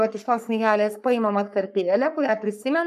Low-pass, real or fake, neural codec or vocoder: 14.4 kHz; fake; codec, 44.1 kHz, 3.4 kbps, Pupu-Codec